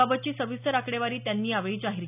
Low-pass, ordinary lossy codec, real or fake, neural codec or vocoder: 3.6 kHz; none; real; none